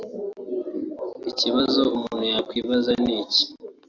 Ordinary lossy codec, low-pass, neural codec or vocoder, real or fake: AAC, 48 kbps; 7.2 kHz; none; real